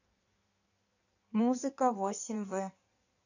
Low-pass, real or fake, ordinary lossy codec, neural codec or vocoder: 7.2 kHz; fake; none; codec, 16 kHz in and 24 kHz out, 1.1 kbps, FireRedTTS-2 codec